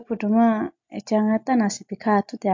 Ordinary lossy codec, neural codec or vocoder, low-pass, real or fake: MP3, 48 kbps; none; 7.2 kHz; real